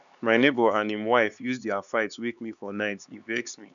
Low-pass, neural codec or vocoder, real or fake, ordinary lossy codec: 7.2 kHz; codec, 16 kHz, 4 kbps, X-Codec, HuBERT features, trained on LibriSpeech; fake; none